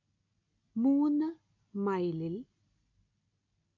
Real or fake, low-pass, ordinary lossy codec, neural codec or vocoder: real; 7.2 kHz; AAC, 48 kbps; none